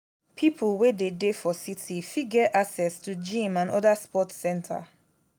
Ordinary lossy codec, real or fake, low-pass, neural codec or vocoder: none; real; none; none